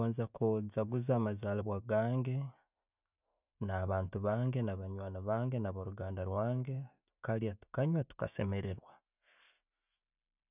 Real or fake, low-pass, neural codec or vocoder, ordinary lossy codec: real; 3.6 kHz; none; none